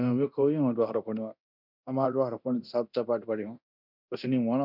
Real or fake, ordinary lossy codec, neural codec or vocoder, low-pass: fake; none; codec, 24 kHz, 0.9 kbps, DualCodec; 5.4 kHz